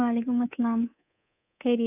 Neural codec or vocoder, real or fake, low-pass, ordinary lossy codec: codec, 24 kHz, 3.1 kbps, DualCodec; fake; 3.6 kHz; none